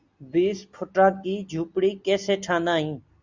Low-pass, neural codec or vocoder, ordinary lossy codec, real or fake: 7.2 kHz; none; Opus, 64 kbps; real